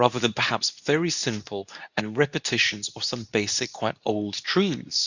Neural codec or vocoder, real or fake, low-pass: codec, 24 kHz, 0.9 kbps, WavTokenizer, medium speech release version 2; fake; 7.2 kHz